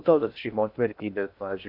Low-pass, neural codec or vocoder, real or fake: 5.4 kHz; codec, 16 kHz in and 24 kHz out, 0.6 kbps, FocalCodec, streaming, 2048 codes; fake